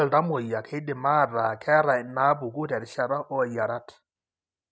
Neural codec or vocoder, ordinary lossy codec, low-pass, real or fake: none; none; none; real